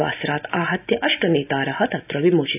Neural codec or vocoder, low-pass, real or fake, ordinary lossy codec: none; 3.6 kHz; real; none